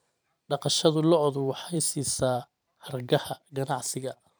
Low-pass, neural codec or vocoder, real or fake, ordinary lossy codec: none; vocoder, 44.1 kHz, 128 mel bands every 512 samples, BigVGAN v2; fake; none